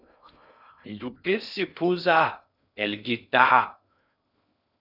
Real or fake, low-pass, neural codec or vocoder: fake; 5.4 kHz; codec, 16 kHz in and 24 kHz out, 0.6 kbps, FocalCodec, streaming, 2048 codes